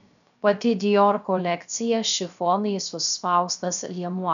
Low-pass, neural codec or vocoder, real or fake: 7.2 kHz; codec, 16 kHz, 0.3 kbps, FocalCodec; fake